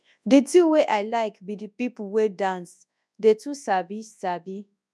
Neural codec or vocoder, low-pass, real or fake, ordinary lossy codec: codec, 24 kHz, 0.9 kbps, WavTokenizer, large speech release; none; fake; none